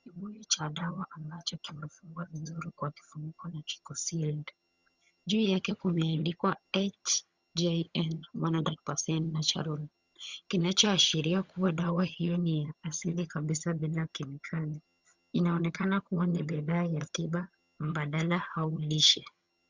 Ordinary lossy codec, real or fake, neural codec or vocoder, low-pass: Opus, 64 kbps; fake; vocoder, 22.05 kHz, 80 mel bands, HiFi-GAN; 7.2 kHz